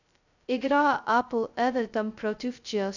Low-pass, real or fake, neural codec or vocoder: 7.2 kHz; fake; codec, 16 kHz, 0.2 kbps, FocalCodec